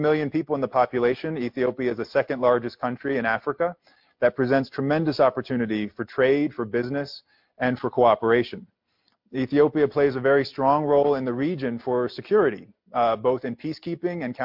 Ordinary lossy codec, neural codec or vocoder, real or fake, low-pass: MP3, 48 kbps; none; real; 5.4 kHz